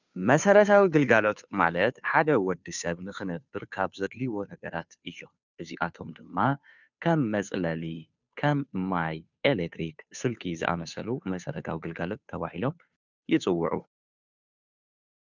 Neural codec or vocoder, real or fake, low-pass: codec, 16 kHz, 2 kbps, FunCodec, trained on Chinese and English, 25 frames a second; fake; 7.2 kHz